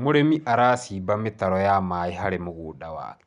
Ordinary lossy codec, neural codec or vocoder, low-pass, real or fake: none; none; 10.8 kHz; real